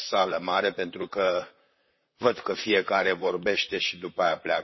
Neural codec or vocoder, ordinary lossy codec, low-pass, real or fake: vocoder, 44.1 kHz, 128 mel bands, Pupu-Vocoder; MP3, 24 kbps; 7.2 kHz; fake